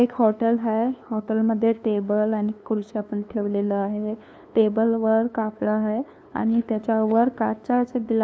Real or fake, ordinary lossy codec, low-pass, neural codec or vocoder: fake; none; none; codec, 16 kHz, 2 kbps, FunCodec, trained on LibriTTS, 25 frames a second